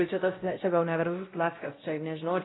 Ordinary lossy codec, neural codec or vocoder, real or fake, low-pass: AAC, 16 kbps; codec, 16 kHz, 0.5 kbps, X-Codec, WavLM features, trained on Multilingual LibriSpeech; fake; 7.2 kHz